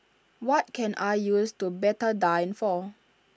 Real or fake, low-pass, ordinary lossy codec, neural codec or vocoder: real; none; none; none